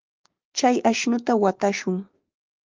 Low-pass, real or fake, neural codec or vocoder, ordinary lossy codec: 7.2 kHz; fake; codec, 16 kHz, 6 kbps, DAC; Opus, 32 kbps